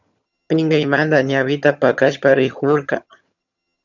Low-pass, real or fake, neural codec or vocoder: 7.2 kHz; fake; vocoder, 22.05 kHz, 80 mel bands, HiFi-GAN